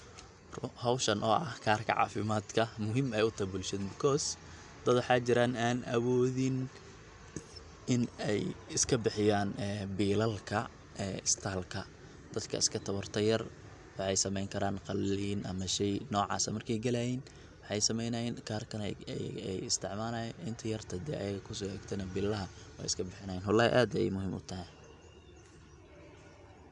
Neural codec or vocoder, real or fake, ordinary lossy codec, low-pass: none; real; none; 10.8 kHz